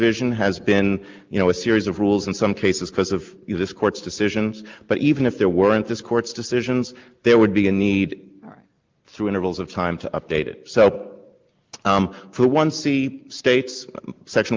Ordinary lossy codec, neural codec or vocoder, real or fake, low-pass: Opus, 24 kbps; none; real; 7.2 kHz